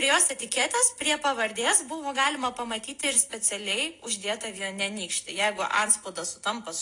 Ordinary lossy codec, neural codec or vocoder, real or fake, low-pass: AAC, 48 kbps; none; real; 10.8 kHz